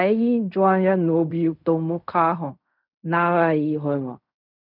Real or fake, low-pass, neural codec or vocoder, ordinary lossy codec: fake; 5.4 kHz; codec, 16 kHz in and 24 kHz out, 0.4 kbps, LongCat-Audio-Codec, fine tuned four codebook decoder; none